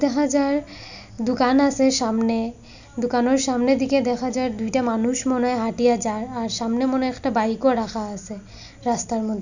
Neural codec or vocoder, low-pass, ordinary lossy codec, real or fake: none; 7.2 kHz; none; real